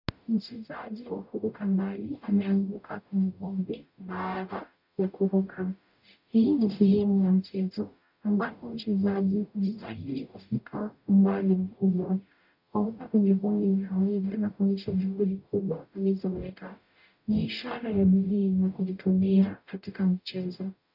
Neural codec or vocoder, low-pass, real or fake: codec, 44.1 kHz, 0.9 kbps, DAC; 5.4 kHz; fake